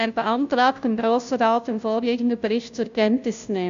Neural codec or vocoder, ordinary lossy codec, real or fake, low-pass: codec, 16 kHz, 0.5 kbps, FunCodec, trained on Chinese and English, 25 frames a second; MP3, 64 kbps; fake; 7.2 kHz